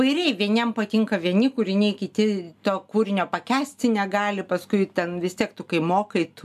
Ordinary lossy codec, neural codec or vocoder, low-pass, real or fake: MP3, 96 kbps; none; 14.4 kHz; real